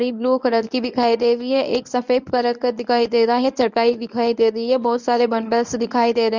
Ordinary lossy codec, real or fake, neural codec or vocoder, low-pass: none; fake; codec, 24 kHz, 0.9 kbps, WavTokenizer, medium speech release version 1; 7.2 kHz